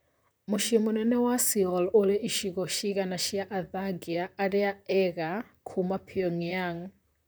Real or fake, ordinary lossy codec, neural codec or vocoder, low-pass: fake; none; vocoder, 44.1 kHz, 128 mel bands, Pupu-Vocoder; none